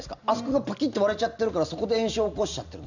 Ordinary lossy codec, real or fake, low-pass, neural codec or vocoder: none; real; 7.2 kHz; none